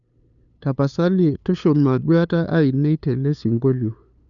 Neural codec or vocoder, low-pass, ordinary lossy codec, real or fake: codec, 16 kHz, 2 kbps, FunCodec, trained on LibriTTS, 25 frames a second; 7.2 kHz; none; fake